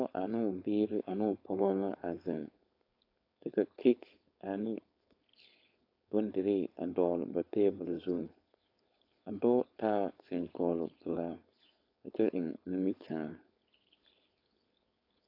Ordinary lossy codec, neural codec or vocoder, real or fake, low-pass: AAC, 32 kbps; codec, 16 kHz, 4.8 kbps, FACodec; fake; 5.4 kHz